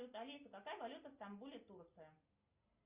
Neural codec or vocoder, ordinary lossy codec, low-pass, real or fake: none; Opus, 64 kbps; 3.6 kHz; real